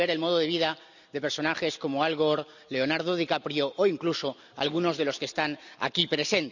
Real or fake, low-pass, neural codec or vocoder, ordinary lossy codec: real; 7.2 kHz; none; none